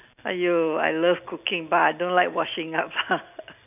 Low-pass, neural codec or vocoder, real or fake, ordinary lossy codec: 3.6 kHz; none; real; none